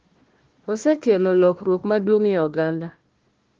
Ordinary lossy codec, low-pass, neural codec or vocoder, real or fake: Opus, 16 kbps; 7.2 kHz; codec, 16 kHz, 1 kbps, FunCodec, trained on Chinese and English, 50 frames a second; fake